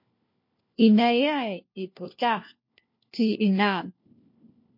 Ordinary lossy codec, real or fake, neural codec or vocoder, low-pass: MP3, 32 kbps; fake; codec, 16 kHz, 1 kbps, FunCodec, trained on LibriTTS, 50 frames a second; 5.4 kHz